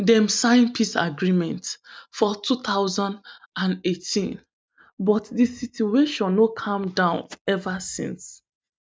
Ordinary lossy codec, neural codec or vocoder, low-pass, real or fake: none; none; none; real